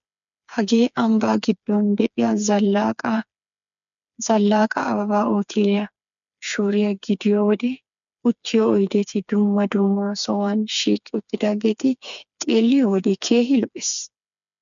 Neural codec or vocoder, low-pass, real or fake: codec, 16 kHz, 4 kbps, FreqCodec, smaller model; 7.2 kHz; fake